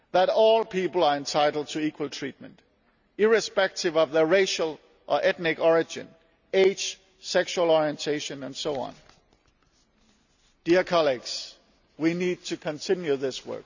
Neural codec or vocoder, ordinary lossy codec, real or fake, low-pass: none; none; real; 7.2 kHz